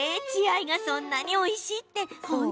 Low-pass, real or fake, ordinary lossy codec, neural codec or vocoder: none; real; none; none